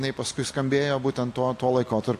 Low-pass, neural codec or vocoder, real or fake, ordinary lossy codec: 14.4 kHz; none; real; AAC, 64 kbps